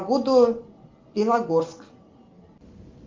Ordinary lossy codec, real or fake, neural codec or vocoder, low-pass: Opus, 32 kbps; real; none; 7.2 kHz